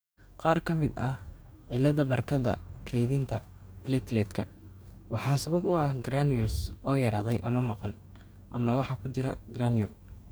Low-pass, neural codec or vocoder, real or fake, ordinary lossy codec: none; codec, 44.1 kHz, 2.6 kbps, DAC; fake; none